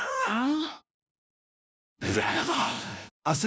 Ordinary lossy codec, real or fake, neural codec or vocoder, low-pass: none; fake; codec, 16 kHz, 0.5 kbps, FunCodec, trained on LibriTTS, 25 frames a second; none